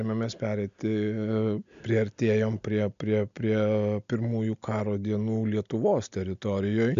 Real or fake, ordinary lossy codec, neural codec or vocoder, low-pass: real; MP3, 64 kbps; none; 7.2 kHz